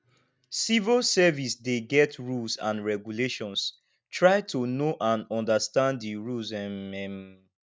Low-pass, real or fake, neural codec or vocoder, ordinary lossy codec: none; real; none; none